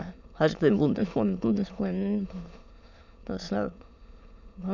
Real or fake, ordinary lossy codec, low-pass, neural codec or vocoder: fake; none; 7.2 kHz; autoencoder, 22.05 kHz, a latent of 192 numbers a frame, VITS, trained on many speakers